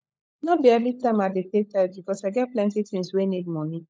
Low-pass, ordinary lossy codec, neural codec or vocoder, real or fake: none; none; codec, 16 kHz, 16 kbps, FunCodec, trained on LibriTTS, 50 frames a second; fake